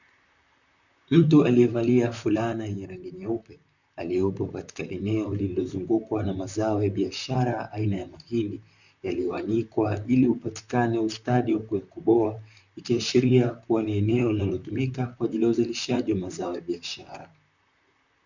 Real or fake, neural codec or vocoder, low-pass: fake; vocoder, 44.1 kHz, 128 mel bands, Pupu-Vocoder; 7.2 kHz